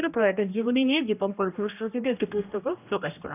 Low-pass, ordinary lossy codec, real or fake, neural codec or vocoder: 3.6 kHz; none; fake; codec, 16 kHz, 1 kbps, X-Codec, HuBERT features, trained on general audio